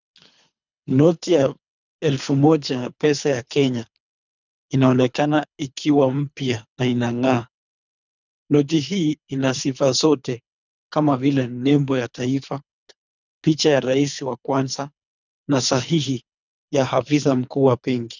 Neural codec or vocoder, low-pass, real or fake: codec, 24 kHz, 3 kbps, HILCodec; 7.2 kHz; fake